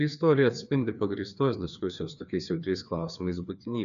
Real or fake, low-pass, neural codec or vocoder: fake; 7.2 kHz; codec, 16 kHz, 2 kbps, FreqCodec, larger model